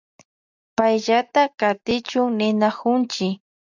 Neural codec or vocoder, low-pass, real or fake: none; 7.2 kHz; real